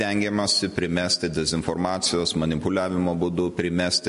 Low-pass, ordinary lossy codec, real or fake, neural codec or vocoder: 14.4 kHz; MP3, 48 kbps; real; none